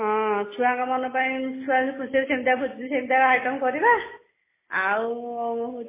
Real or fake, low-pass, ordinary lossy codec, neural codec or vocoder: real; 3.6 kHz; MP3, 16 kbps; none